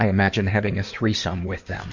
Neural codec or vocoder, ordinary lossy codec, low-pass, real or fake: codec, 16 kHz in and 24 kHz out, 2.2 kbps, FireRedTTS-2 codec; MP3, 64 kbps; 7.2 kHz; fake